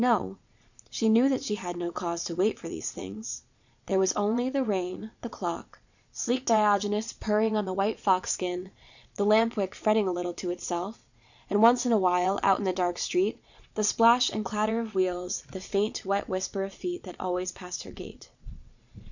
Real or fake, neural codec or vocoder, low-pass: fake; vocoder, 22.05 kHz, 80 mel bands, Vocos; 7.2 kHz